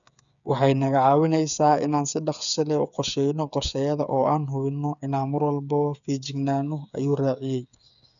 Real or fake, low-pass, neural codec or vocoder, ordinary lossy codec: fake; 7.2 kHz; codec, 16 kHz, 8 kbps, FreqCodec, smaller model; none